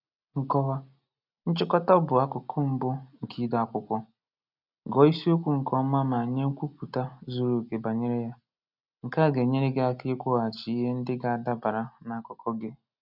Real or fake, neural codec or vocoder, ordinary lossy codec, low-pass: real; none; none; 5.4 kHz